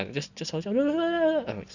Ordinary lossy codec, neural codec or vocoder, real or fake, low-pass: none; none; real; 7.2 kHz